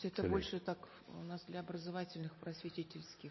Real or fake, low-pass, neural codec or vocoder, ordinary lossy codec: real; 7.2 kHz; none; MP3, 24 kbps